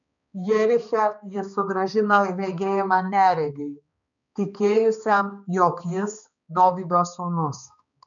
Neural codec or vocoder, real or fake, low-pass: codec, 16 kHz, 2 kbps, X-Codec, HuBERT features, trained on balanced general audio; fake; 7.2 kHz